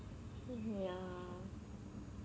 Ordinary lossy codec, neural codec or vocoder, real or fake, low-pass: none; none; real; none